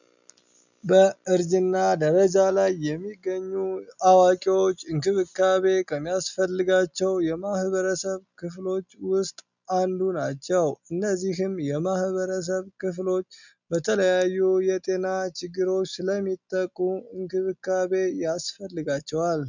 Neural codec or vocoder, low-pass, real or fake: none; 7.2 kHz; real